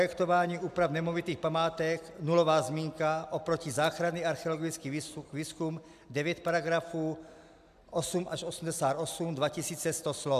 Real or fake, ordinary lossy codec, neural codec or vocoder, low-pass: real; AAC, 96 kbps; none; 14.4 kHz